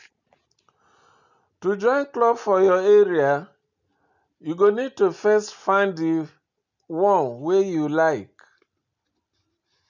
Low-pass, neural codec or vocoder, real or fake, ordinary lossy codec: 7.2 kHz; none; real; none